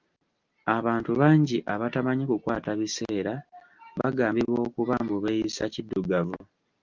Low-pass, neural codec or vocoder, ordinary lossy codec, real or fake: 7.2 kHz; none; Opus, 24 kbps; real